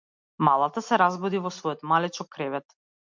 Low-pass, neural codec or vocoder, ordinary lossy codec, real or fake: 7.2 kHz; none; MP3, 64 kbps; real